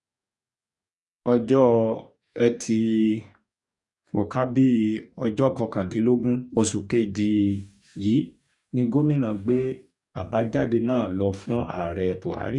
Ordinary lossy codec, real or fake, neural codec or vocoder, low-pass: none; fake; codec, 44.1 kHz, 2.6 kbps, DAC; 10.8 kHz